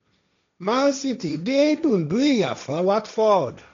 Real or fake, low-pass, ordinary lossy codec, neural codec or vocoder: fake; 7.2 kHz; MP3, 64 kbps; codec, 16 kHz, 1.1 kbps, Voila-Tokenizer